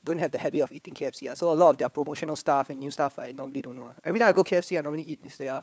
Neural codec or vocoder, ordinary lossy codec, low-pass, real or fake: codec, 16 kHz, 4 kbps, FunCodec, trained on LibriTTS, 50 frames a second; none; none; fake